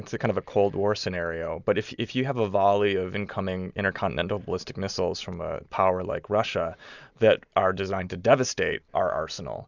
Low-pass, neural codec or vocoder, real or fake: 7.2 kHz; none; real